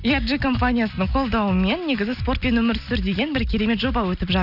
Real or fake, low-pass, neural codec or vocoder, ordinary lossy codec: real; 5.4 kHz; none; none